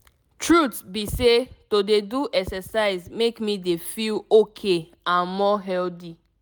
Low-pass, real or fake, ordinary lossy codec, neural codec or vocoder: none; real; none; none